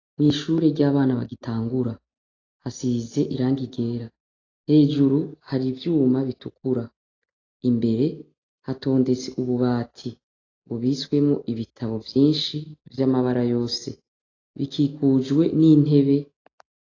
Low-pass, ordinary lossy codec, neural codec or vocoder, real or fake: 7.2 kHz; AAC, 32 kbps; none; real